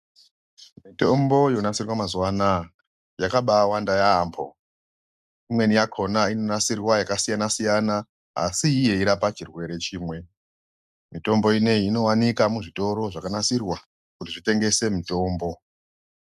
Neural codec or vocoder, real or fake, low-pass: none; real; 14.4 kHz